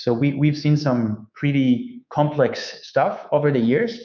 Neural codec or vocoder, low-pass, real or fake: codec, 24 kHz, 3.1 kbps, DualCodec; 7.2 kHz; fake